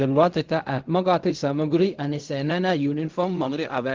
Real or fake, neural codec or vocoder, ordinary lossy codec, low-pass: fake; codec, 16 kHz in and 24 kHz out, 0.4 kbps, LongCat-Audio-Codec, fine tuned four codebook decoder; Opus, 32 kbps; 7.2 kHz